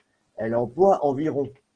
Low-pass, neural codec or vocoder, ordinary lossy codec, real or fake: 9.9 kHz; none; Opus, 16 kbps; real